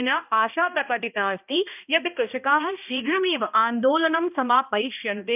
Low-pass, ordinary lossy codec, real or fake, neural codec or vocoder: 3.6 kHz; none; fake; codec, 16 kHz, 1 kbps, X-Codec, HuBERT features, trained on balanced general audio